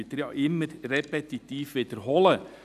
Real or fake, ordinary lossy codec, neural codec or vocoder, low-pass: real; none; none; 14.4 kHz